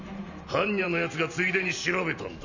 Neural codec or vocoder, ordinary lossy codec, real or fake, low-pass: vocoder, 44.1 kHz, 128 mel bands every 512 samples, BigVGAN v2; none; fake; 7.2 kHz